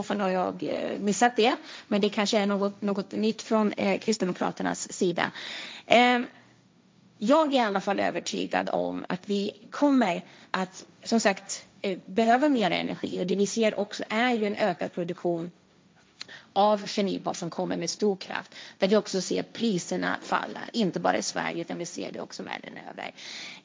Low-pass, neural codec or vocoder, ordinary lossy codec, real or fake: none; codec, 16 kHz, 1.1 kbps, Voila-Tokenizer; none; fake